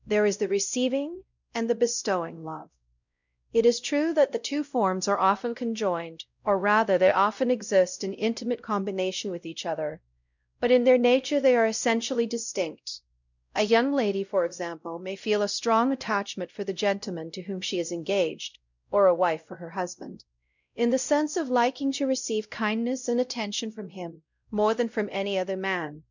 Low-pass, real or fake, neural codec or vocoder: 7.2 kHz; fake; codec, 16 kHz, 0.5 kbps, X-Codec, WavLM features, trained on Multilingual LibriSpeech